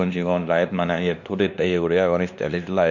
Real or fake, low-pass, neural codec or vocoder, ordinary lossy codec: fake; 7.2 kHz; codec, 16 kHz, 2 kbps, X-Codec, WavLM features, trained on Multilingual LibriSpeech; none